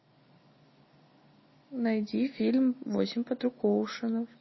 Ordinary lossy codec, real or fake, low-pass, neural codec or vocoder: MP3, 24 kbps; real; 7.2 kHz; none